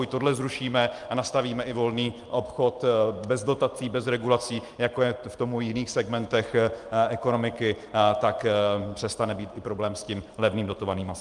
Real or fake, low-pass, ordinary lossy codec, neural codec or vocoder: real; 10.8 kHz; Opus, 32 kbps; none